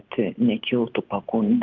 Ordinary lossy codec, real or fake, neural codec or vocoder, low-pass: Opus, 24 kbps; real; none; 7.2 kHz